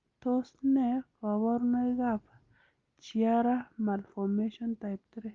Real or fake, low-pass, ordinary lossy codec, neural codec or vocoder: real; 7.2 kHz; Opus, 32 kbps; none